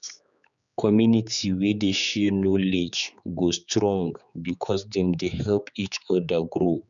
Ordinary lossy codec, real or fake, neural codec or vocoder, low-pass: none; fake; codec, 16 kHz, 4 kbps, X-Codec, HuBERT features, trained on general audio; 7.2 kHz